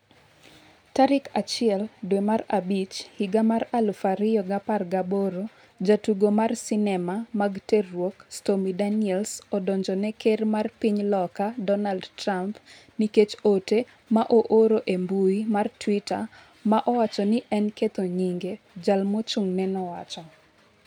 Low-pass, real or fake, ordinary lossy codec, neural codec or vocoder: 19.8 kHz; real; none; none